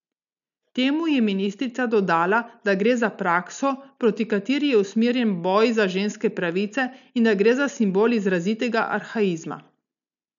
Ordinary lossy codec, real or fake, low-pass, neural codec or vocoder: none; real; 7.2 kHz; none